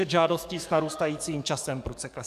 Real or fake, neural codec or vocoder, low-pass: fake; codec, 44.1 kHz, 7.8 kbps, DAC; 14.4 kHz